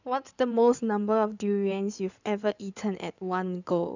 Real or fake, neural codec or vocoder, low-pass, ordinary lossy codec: fake; codec, 16 kHz in and 24 kHz out, 2.2 kbps, FireRedTTS-2 codec; 7.2 kHz; none